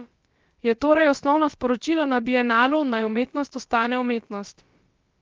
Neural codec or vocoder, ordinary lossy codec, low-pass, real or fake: codec, 16 kHz, about 1 kbps, DyCAST, with the encoder's durations; Opus, 32 kbps; 7.2 kHz; fake